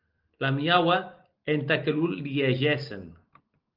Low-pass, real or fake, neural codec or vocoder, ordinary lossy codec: 5.4 kHz; real; none; Opus, 32 kbps